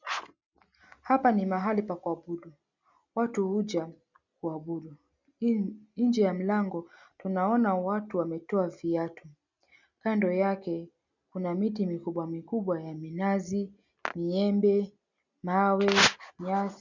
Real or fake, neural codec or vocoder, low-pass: real; none; 7.2 kHz